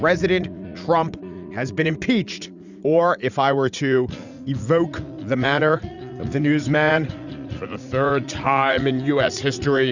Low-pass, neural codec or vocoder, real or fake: 7.2 kHz; vocoder, 44.1 kHz, 80 mel bands, Vocos; fake